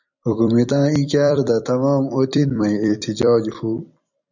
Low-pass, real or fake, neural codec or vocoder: 7.2 kHz; fake; vocoder, 44.1 kHz, 128 mel bands every 256 samples, BigVGAN v2